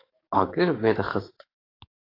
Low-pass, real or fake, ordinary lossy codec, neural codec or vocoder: 5.4 kHz; fake; AAC, 24 kbps; codec, 44.1 kHz, 7.8 kbps, DAC